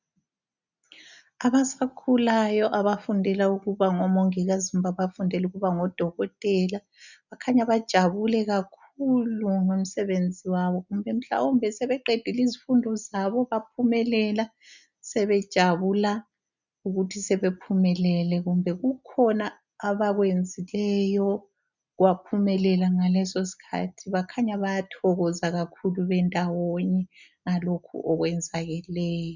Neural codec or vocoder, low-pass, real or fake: none; 7.2 kHz; real